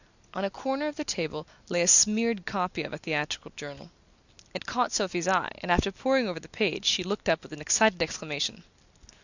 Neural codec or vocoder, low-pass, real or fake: none; 7.2 kHz; real